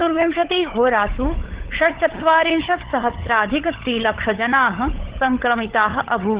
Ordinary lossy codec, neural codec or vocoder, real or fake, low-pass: Opus, 16 kbps; codec, 16 kHz, 16 kbps, FunCodec, trained on LibriTTS, 50 frames a second; fake; 3.6 kHz